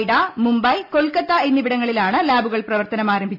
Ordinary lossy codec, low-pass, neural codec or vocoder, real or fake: none; 5.4 kHz; none; real